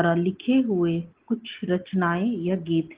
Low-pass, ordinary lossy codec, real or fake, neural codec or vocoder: 3.6 kHz; Opus, 16 kbps; real; none